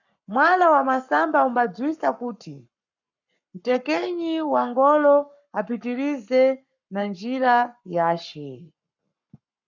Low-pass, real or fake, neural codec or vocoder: 7.2 kHz; fake; codec, 44.1 kHz, 7.8 kbps, Pupu-Codec